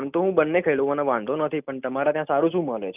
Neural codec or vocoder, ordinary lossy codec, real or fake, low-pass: none; none; real; 3.6 kHz